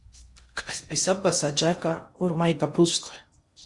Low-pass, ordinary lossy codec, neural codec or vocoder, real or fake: 10.8 kHz; Opus, 64 kbps; codec, 16 kHz in and 24 kHz out, 0.6 kbps, FocalCodec, streaming, 4096 codes; fake